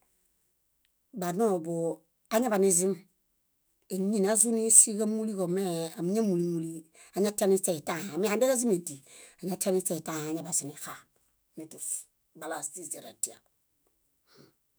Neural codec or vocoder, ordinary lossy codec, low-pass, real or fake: autoencoder, 48 kHz, 128 numbers a frame, DAC-VAE, trained on Japanese speech; none; none; fake